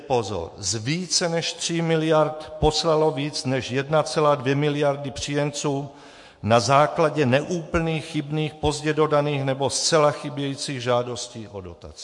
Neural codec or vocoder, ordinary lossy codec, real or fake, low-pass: autoencoder, 48 kHz, 128 numbers a frame, DAC-VAE, trained on Japanese speech; MP3, 48 kbps; fake; 10.8 kHz